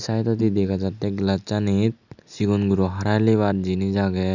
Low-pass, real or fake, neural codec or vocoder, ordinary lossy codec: 7.2 kHz; real; none; none